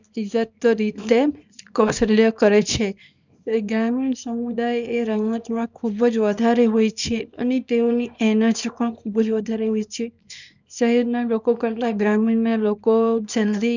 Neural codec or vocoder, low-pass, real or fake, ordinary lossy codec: codec, 24 kHz, 0.9 kbps, WavTokenizer, small release; 7.2 kHz; fake; none